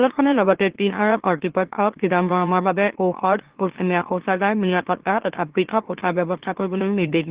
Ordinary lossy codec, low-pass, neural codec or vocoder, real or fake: Opus, 16 kbps; 3.6 kHz; autoencoder, 44.1 kHz, a latent of 192 numbers a frame, MeloTTS; fake